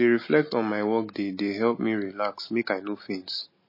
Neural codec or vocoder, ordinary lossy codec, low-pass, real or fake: none; MP3, 24 kbps; 5.4 kHz; real